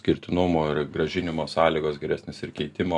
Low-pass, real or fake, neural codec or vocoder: 10.8 kHz; real; none